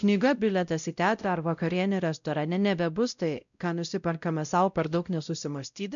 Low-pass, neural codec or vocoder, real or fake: 7.2 kHz; codec, 16 kHz, 0.5 kbps, X-Codec, WavLM features, trained on Multilingual LibriSpeech; fake